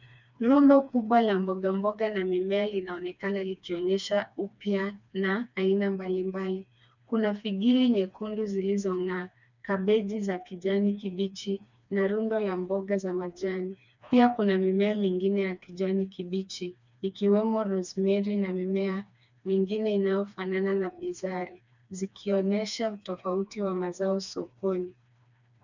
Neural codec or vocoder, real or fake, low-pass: codec, 16 kHz, 2 kbps, FreqCodec, smaller model; fake; 7.2 kHz